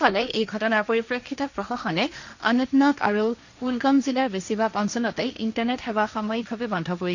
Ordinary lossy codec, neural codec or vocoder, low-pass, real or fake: none; codec, 16 kHz, 1.1 kbps, Voila-Tokenizer; 7.2 kHz; fake